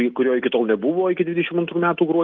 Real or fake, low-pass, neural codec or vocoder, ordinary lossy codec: real; 7.2 kHz; none; Opus, 32 kbps